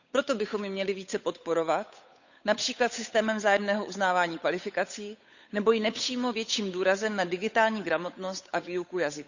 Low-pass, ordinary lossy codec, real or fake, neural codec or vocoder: 7.2 kHz; none; fake; codec, 16 kHz, 8 kbps, FunCodec, trained on Chinese and English, 25 frames a second